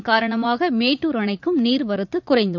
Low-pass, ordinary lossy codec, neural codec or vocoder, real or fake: 7.2 kHz; none; vocoder, 44.1 kHz, 80 mel bands, Vocos; fake